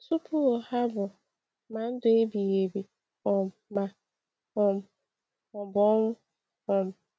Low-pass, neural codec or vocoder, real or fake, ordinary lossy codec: none; none; real; none